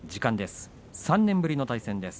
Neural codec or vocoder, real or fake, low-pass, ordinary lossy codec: none; real; none; none